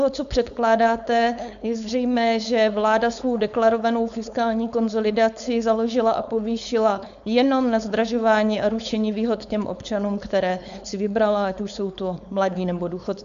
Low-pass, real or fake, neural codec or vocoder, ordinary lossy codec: 7.2 kHz; fake; codec, 16 kHz, 4.8 kbps, FACodec; MP3, 96 kbps